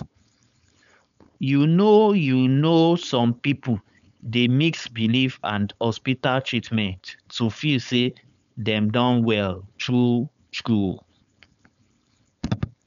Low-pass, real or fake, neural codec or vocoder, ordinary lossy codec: 7.2 kHz; fake; codec, 16 kHz, 4.8 kbps, FACodec; none